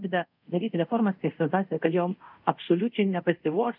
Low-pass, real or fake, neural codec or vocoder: 5.4 kHz; fake; codec, 24 kHz, 0.5 kbps, DualCodec